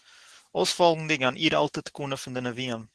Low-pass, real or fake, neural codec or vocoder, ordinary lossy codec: 10.8 kHz; real; none; Opus, 16 kbps